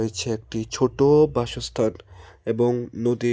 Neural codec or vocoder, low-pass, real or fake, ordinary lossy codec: none; none; real; none